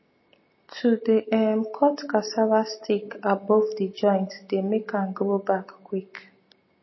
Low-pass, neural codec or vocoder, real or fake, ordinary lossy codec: 7.2 kHz; none; real; MP3, 24 kbps